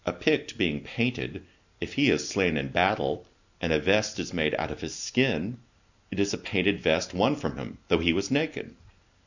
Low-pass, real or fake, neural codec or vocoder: 7.2 kHz; real; none